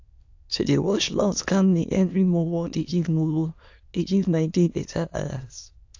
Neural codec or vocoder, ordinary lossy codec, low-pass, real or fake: autoencoder, 22.05 kHz, a latent of 192 numbers a frame, VITS, trained on many speakers; AAC, 48 kbps; 7.2 kHz; fake